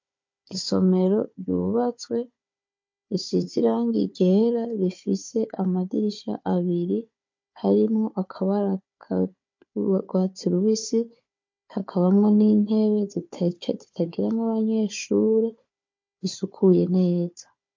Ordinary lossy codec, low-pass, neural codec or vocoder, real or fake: MP3, 48 kbps; 7.2 kHz; codec, 16 kHz, 4 kbps, FunCodec, trained on Chinese and English, 50 frames a second; fake